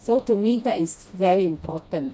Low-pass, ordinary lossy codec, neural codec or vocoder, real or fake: none; none; codec, 16 kHz, 1 kbps, FreqCodec, smaller model; fake